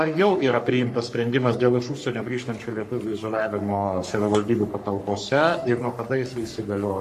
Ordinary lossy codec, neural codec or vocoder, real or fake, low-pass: MP3, 64 kbps; codec, 44.1 kHz, 3.4 kbps, Pupu-Codec; fake; 14.4 kHz